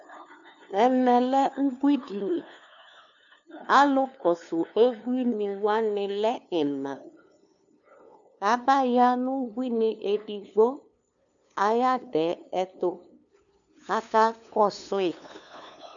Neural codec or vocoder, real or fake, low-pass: codec, 16 kHz, 2 kbps, FunCodec, trained on LibriTTS, 25 frames a second; fake; 7.2 kHz